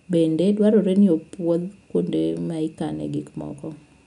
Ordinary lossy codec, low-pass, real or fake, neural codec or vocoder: none; 10.8 kHz; real; none